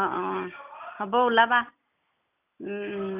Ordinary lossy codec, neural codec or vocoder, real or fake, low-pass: none; none; real; 3.6 kHz